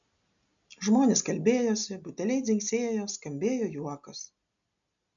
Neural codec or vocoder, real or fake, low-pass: none; real; 7.2 kHz